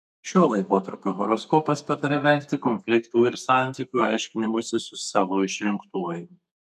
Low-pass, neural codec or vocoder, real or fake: 14.4 kHz; codec, 32 kHz, 1.9 kbps, SNAC; fake